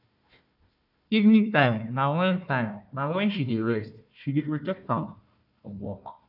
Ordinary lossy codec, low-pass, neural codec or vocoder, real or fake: none; 5.4 kHz; codec, 16 kHz, 1 kbps, FunCodec, trained on Chinese and English, 50 frames a second; fake